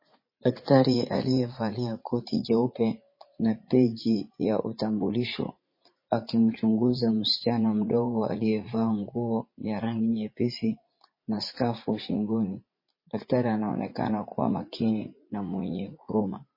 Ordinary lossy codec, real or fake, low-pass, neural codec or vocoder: MP3, 24 kbps; fake; 5.4 kHz; vocoder, 44.1 kHz, 80 mel bands, Vocos